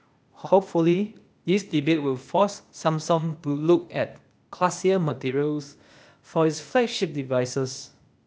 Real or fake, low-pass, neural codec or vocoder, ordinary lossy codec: fake; none; codec, 16 kHz, 0.8 kbps, ZipCodec; none